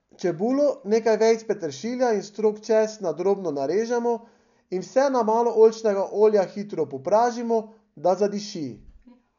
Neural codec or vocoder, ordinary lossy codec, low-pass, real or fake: none; none; 7.2 kHz; real